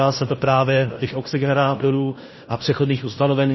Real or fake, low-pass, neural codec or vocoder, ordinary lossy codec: fake; 7.2 kHz; codec, 16 kHz in and 24 kHz out, 0.9 kbps, LongCat-Audio-Codec, fine tuned four codebook decoder; MP3, 24 kbps